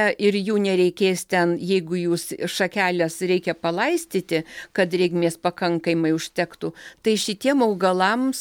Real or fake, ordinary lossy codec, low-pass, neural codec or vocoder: real; MP3, 96 kbps; 19.8 kHz; none